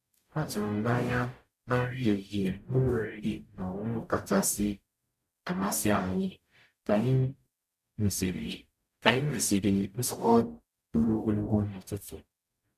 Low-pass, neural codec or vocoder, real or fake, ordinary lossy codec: 14.4 kHz; codec, 44.1 kHz, 0.9 kbps, DAC; fake; MP3, 96 kbps